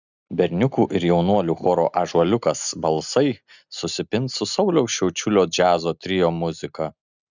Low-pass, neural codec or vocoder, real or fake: 7.2 kHz; none; real